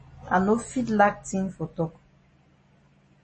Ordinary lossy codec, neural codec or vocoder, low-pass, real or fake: MP3, 32 kbps; none; 10.8 kHz; real